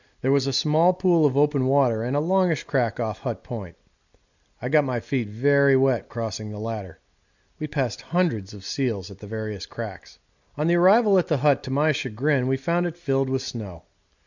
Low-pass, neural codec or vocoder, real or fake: 7.2 kHz; none; real